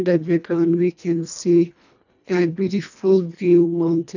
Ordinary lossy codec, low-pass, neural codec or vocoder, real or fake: none; 7.2 kHz; codec, 24 kHz, 1.5 kbps, HILCodec; fake